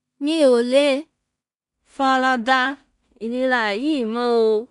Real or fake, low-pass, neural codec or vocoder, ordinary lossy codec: fake; 10.8 kHz; codec, 16 kHz in and 24 kHz out, 0.4 kbps, LongCat-Audio-Codec, two codebook decoder; none